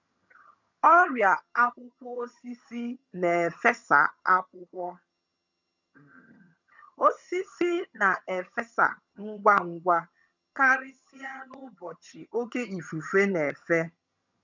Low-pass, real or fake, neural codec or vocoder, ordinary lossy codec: 7.2 kHz; fake; vocoder, 22.05 kHz, 80 mel bands, HiFi-GAN; none